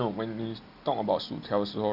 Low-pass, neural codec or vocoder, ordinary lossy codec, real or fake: 5.4 kHz; none; none; real